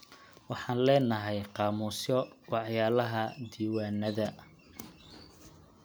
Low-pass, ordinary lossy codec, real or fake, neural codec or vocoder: none; none; real; none